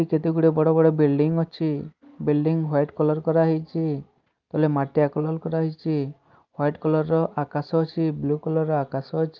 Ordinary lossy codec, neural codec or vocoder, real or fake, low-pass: Opus, 24 kbps; none; real; 7.2 kHz